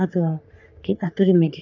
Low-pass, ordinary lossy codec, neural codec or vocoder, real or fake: 7.2 kHz; none; codec, 44.1 kHz, 3.4 kbps, Pupu-Codec; fake